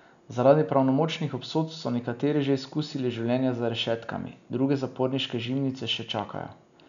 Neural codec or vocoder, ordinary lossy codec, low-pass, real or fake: none; none; 7.2 kHz; real